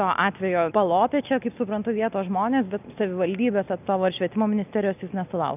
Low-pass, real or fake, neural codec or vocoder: 3.6 kHz; real; none